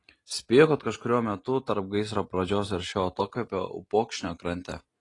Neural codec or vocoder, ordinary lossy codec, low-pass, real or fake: none; AAC, 32 kbps; 10.8 kHz; real